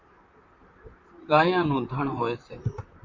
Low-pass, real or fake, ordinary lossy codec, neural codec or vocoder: 7.2 kHz; fake; MP3, 48 kbps; vocoder, 44.1 kHz, 128 mel bands, Pupu-Vocoder